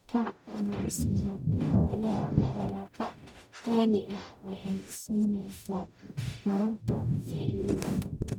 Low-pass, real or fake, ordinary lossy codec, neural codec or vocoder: 19.8 kHz; fake; none; codec, 44.1 kHz, 0.9 kbps, DAC